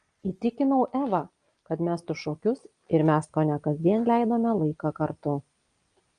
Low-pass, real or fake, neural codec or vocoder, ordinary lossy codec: 9.9 kHz; real; none; Opus, 32 kbps